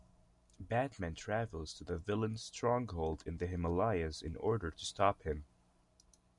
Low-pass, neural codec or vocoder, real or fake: 10.8 kHz; none; real